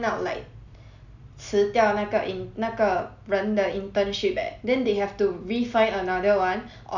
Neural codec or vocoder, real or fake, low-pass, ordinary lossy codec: none; real; 7.2 kHz; none